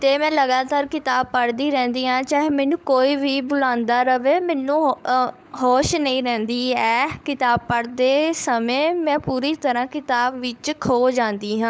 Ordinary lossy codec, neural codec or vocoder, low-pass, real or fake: none; codec, 16 kHz, 16 kbps, FunCodec, trained on LibriTTS, 50 frames a second; none; fake